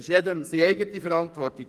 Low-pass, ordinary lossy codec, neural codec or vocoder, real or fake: 14.4 kHz; Opus, 32 kbps; codec, 44.1 kHz, 2.6 kbps, SNAC; fake